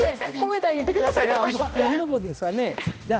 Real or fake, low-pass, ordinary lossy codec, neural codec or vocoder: fake; none; none; codec, 16 kHz, 1 kbps, X-Codec, HuBERT features, trained on balanced general audio